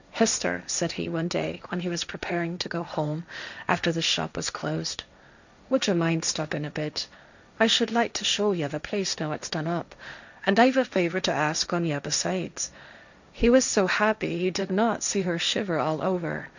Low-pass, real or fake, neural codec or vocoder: 7.2 kHz; fake; codec, 16 kHz, 1.1 kbps, Voila-Tokenizer